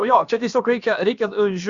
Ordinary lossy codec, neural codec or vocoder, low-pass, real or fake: Opus, 64 kbps; codec, 16 kHz, about 1 kbps, DyCAST, with the encoder's durations; 7.2 kHz; fake